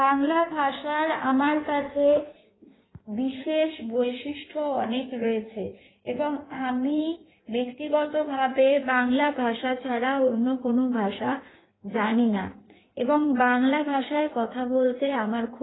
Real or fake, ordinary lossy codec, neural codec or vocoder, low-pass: fake; AAC, 16 kbps; codec, 16 kHz in and 24 kHz out, 1.1 kbps, FireRedTTS-2 codec; 7.2 kHz